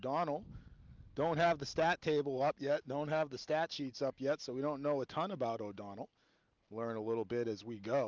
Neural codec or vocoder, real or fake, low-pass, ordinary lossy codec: none; real; 7.2 kHz; Opus, 32 kbps